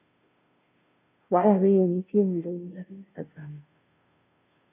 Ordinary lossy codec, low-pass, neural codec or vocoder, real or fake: none; 3.6 kHz; codec, 16 kHz, 0.5 kbps, FunCodec, trained on Chinese and English, 25 frames a second; fake